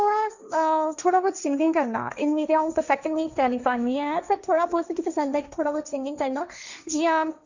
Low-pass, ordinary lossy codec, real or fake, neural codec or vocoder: 7.2 kHz; none; fake; codec, 16 kHz, 1.1 kbps, Voila-Tokenizer